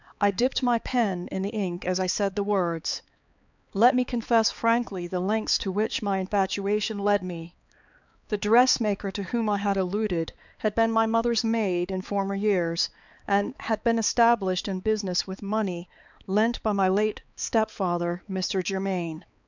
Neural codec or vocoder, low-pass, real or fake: codec, 16 kHz, 4 kbps, X-Codec, HuBERT features, trained on balanced general audio; 7.2 kHz; fake